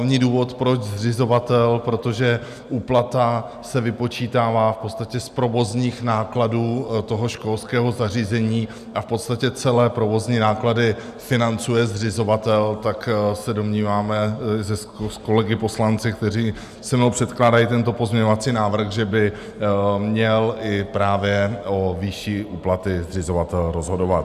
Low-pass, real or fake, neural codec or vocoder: 14.4 kHz; real; none